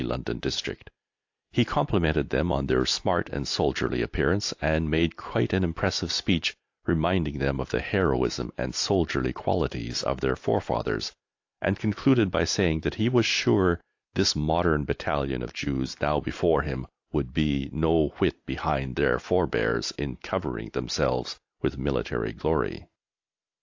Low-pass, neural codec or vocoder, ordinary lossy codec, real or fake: 7.2 kHz; none; AAC, 48 kbps; real